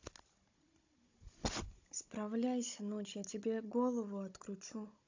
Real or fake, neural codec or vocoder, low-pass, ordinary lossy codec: fake; codec, 16 kHz, 16 kbps, FunCodec, trained on Chinese and English, 50 frames a second; 7.2 kHz; AAC, 48 kbps